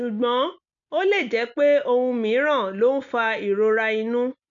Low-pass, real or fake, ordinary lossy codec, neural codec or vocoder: 7.2 kHz; real; none; none